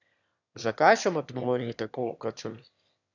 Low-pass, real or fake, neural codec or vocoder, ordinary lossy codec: 7.2 kHz; fake; autoencoder, 22.05 kHz, a latent of 192 numbers a frame, VITS, trained on one speaker; none